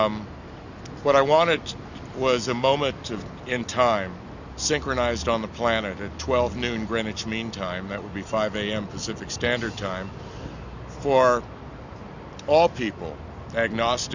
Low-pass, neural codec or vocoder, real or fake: 7.2 kHz; none; real